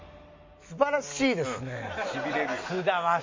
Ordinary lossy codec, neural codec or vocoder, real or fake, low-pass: MP3, 48 kbps; none; real; 7.2 kHz